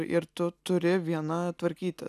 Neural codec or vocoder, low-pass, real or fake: none; 14.4 kHz; real